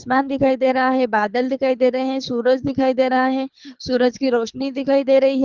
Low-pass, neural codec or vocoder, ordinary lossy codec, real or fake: 7.2 kHz; codec, 24 kHz, 3 kbps, HILCodec; Opus, 32 kbps; fake